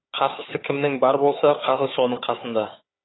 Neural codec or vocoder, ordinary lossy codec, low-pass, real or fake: codec, 16 kHz, 4 kbps, FunCodec, trained on Chinese and English, 50 frames a second; AAC, 16 kbps; 7.2 kHz; fake